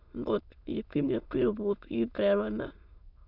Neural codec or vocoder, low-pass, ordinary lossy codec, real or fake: autoencoder, 22.05 kHz, a latent of 192 numbers a frame, VITS, trained on many speakers; 5.4 kHz; none; fake